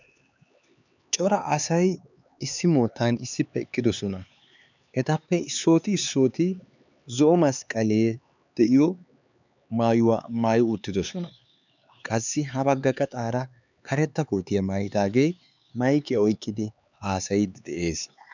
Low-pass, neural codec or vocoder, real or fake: 7.2 kHz; codec, 16 kHz, 4 kbps, X-Codec, HuBERT features, trained on LibriSpeech; fake